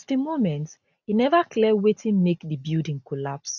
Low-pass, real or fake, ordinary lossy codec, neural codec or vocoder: 7.2 kHz; real; none; none